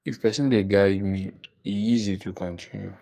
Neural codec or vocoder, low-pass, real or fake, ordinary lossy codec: codec, 44.1 kHz, 2.6 kbps, SNAC; 14.4 kHz; fake; none